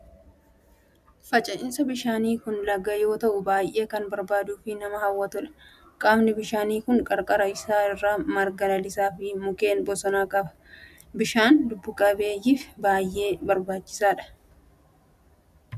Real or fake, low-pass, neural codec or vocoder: real; 14.4 kHz; none